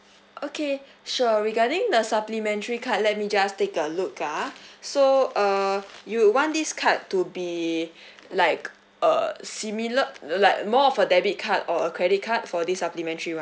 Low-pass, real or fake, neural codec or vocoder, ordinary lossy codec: none; real; none; none